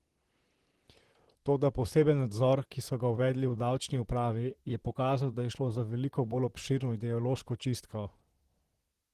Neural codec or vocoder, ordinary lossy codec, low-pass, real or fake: vocoder, 44.1 kHz, 128 mel bands, Pupu-Vocoder; Opus, 16 kbps; 14.4 kHz; fake